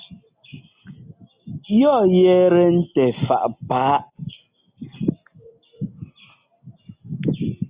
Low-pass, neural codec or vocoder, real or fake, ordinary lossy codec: 3.6 kHz; none; real; Opus, 64 kbps